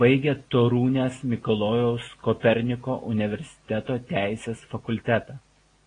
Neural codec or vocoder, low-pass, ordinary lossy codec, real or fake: none; 9.9 kHz; AAC, 32 kbps; real